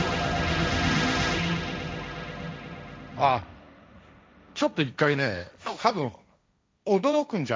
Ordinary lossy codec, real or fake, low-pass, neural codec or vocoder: none; fake; none; codec, 16 kHz, 1.1 kbps, Voila-Tokenizer